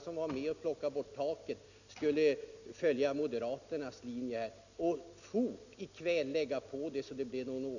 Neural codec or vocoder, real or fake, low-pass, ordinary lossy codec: none; real; 7.2 kHz; none